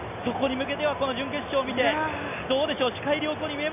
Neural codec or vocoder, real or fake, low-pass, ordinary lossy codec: none; real; 3.6 kHz; none